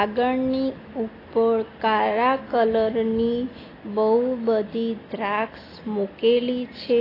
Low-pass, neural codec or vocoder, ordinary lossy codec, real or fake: 5.4 kHz; none; AAC, 24 kbps; real